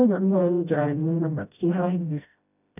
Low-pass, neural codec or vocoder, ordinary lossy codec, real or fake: 3.6 kHz; codec, 16 kHz, 0.5 kbps, FreqCodec, smaller model; none; fake